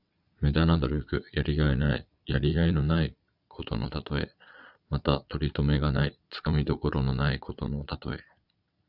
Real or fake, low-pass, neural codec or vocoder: fake; 5.4 kHz; vocoder, 22.05 kHz, 80 mel bands, Vocos